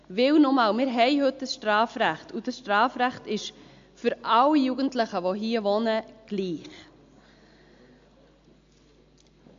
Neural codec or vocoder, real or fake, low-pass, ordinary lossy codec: none; real; 7.2 kHz; AAC, 64 kbps